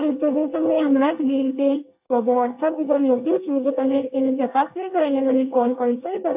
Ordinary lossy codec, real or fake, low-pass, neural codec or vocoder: AAC, 32 kbps; fake; 3.6 kHz; codec, 24 kHz, 1 kbps, SNAC